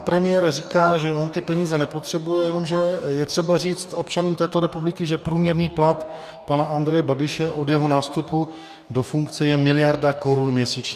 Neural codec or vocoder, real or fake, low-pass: codec, 44.1 kHz, 2.6 kbps, DAC; fake; 14.4 kHz